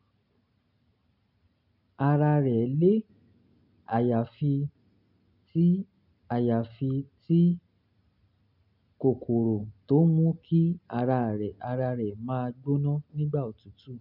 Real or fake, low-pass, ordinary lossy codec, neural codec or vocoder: real; 5.4 kHz; none; none